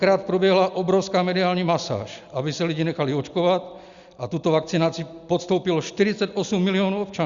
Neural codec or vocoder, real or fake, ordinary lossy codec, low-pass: none; real; Opus, 64 kbps; 7.2 kHz